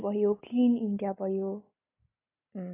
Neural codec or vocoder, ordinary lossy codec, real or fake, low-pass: none; AAC, 16 kbps; real; 3.6 kHz